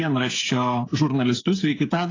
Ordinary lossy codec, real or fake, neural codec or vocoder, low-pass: AAC, 32 kbps; fake; codec, 16 kHz, 8 kbps, FreqCodec, smaller model; 7.2 kHz